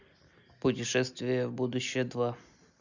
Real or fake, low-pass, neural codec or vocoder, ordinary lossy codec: real; 7.2 kHz; none; none